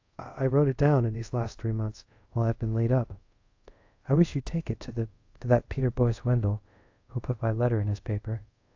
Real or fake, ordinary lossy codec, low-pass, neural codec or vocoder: fake; AAC, 48 kbps; 7.2 kHz; codec, 24 kHz, 0.5 kbps, DualCodec